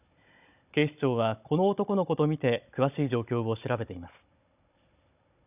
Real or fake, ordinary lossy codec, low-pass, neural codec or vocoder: fake; none; 3.6 kHz; codec, 16 kHz, 16 kbps, FunCodec, trained on Chinese and English, 50 frames a second